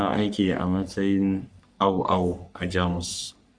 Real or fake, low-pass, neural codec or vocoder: fake; 9.9 kHz; codec, 44.1 kHz, 3.4 kbps, Pupu-Codec